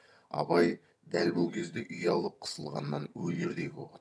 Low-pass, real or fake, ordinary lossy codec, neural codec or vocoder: none; fake; none; vocoder, 22.05 kHz, 80 mel bands, HiFi-GAN